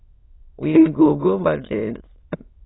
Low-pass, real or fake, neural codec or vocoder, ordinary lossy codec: 7.2 kHz; fake; autoencoder, 22.05 kHz, a latent of 192 numbers a frame, VITS, trained on many speakers; AAC, 16 kbps